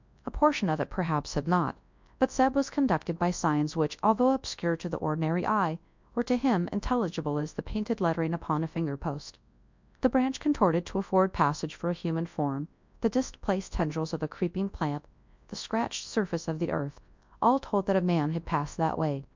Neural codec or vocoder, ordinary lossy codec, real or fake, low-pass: codec, 24 kHz, 0.9 kbps, WavTokenizer, large speech release; MP3, 64 kbps; fake; 7.2 kHz